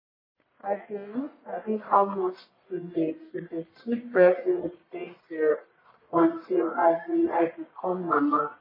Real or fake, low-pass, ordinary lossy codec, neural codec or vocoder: fake; 5.4 kHz; MP3, 24 kbps; codec, 44.1 kHz, 1.7 kbps, Pupu-Codec